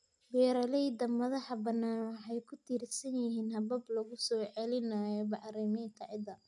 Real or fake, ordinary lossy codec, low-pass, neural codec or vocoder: real; none; 10.8 kHz; none